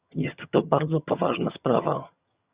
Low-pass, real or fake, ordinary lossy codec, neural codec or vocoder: 3.6 kHz; fake; Opus, 64 kbps; vocoder, 22.05 kHz, 80 mel bands, HiFi-GAN